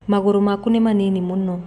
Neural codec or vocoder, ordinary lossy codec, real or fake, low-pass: none; none; real; 14.4 kHz